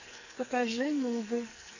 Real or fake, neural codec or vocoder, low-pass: fake; codec, 44.1 kHz, 2.6 kbps, SNAC; 7.2 kHz